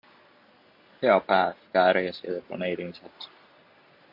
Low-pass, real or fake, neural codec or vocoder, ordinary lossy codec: 5.4 kHz; real; none; MP3, 48 kbps